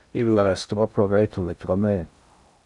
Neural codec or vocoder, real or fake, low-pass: codec, 16 kHz in and 24 kHz out, 0.6 kbps, FocalCodec, streaming, 2048 codes; fake; 10.8 kHz